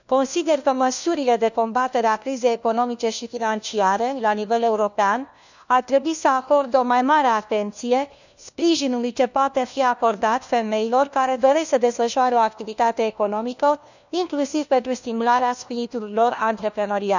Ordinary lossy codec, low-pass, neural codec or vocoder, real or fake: none; 7.2 kHz; codec, 16 kHz, 1 kbps, FunCodec, trained on LibriTTS, 50 frames a second; fake